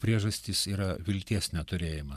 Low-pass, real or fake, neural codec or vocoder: 14.4 kHz; fake; vocoder, 44.1 kHz, 128 mel bands every 512 samples, BigVGAN v2